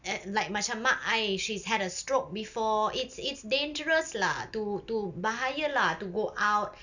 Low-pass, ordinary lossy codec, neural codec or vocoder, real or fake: 7.2 kHz; none; none; real